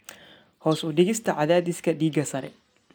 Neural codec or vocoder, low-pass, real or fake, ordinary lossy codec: none; none; real; none